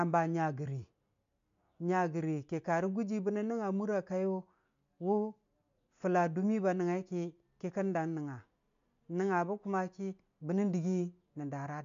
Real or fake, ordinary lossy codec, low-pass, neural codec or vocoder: real; MP3, 64 kbps; 7.2 kHz; none